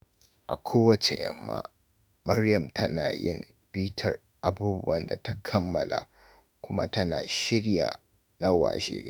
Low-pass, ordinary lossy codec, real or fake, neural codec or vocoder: none; none; fake; autoencoder, 48 kHz, 32 numbers a frame, DAC-VAE, trained on Japanese speech